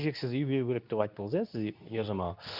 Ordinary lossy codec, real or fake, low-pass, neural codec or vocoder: none; fake; 5.4 kHz; codec, 24 kHz, 0.9 kbps, WavTokenizer, medium speech release version 2